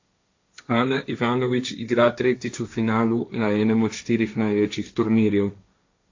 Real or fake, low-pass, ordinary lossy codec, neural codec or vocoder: fake; 7.2 kHz; AAC, 48 kbps; codec, 16 kHz, 1.1 kbps, Voila-Tokenizer